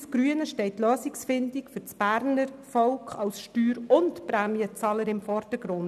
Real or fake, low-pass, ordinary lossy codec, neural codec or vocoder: real; 14.4 kHz; none; none